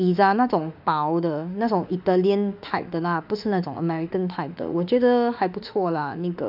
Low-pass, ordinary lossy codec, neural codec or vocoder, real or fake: 5.4 kHz; none; autoencoder, 48 kHz, 32 numbers a frame, DAC-VAE, trained on Japanese speech; fake